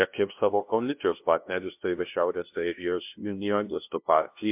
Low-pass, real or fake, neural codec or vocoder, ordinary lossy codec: 3.6 kHz; fake; codec, 16 kHz, 0.5 kbps, FunCodec, trained on LibriTTS, 25 frames a second; MP3, 32 kbps